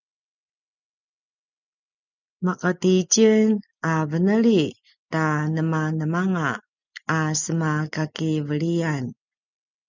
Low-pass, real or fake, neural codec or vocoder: 7.2 kHz; real; none